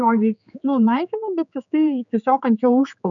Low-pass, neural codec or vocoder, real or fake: 7.2 kHz; codec, 16 kHz, 4 kbps, X-Codec, HuBERT features, trained on general audio; fake